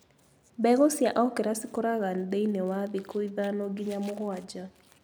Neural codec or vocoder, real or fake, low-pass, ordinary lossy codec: none; real; none; none